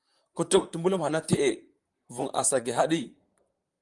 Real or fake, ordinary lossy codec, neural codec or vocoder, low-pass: fake; Opus, 32 kbps; vocoder, 44.1 kHz, 128 mel bands, Pupu-Vocoder; 10.8 kHz